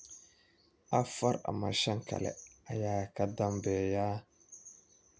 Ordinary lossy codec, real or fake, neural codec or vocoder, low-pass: none; real; none; none